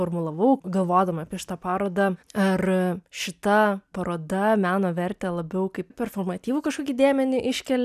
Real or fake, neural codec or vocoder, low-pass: real; none; 14.4 kHz